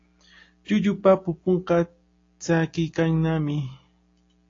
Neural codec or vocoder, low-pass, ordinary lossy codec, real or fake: none; 7.2 kHz; AAC, 32 kbps; real